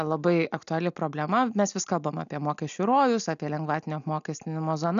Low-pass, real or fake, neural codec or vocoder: 7.2 kHz; real; none